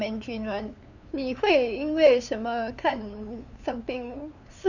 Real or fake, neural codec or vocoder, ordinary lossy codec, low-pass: fake; codec, 16 kHz, 2 kbps, FunCodec, trained on LibriTTS, 25 frames a second; none; 7.2 kHz